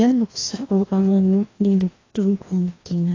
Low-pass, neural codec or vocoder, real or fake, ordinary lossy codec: 7.2 kHz; codec, 16 kHz, 1 kbps, FreqCodec, larger model; fake; AAC, 32 kbps